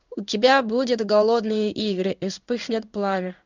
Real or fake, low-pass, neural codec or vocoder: fake; 7.2 kHz; codec, 16 kHz in and 24 kHz out, 1 kbps, XY-Tokenizer